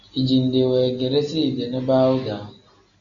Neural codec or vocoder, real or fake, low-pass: none; real; 7.2 kHz